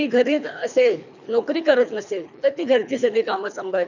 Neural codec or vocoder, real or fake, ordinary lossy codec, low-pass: codec, 24 kHz, 3 kbps, HILCodec; fake; none; 7.2 kHz